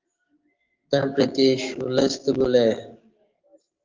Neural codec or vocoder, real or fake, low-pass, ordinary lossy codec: codec, 16 kHz, 6 kbps, DAC; fake; 7.2 kHz; Opus, 24 kbps